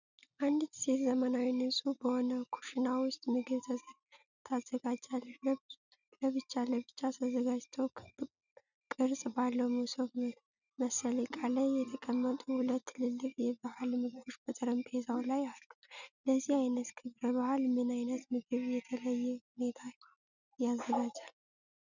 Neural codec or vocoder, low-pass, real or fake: none; 7.2 kHz; real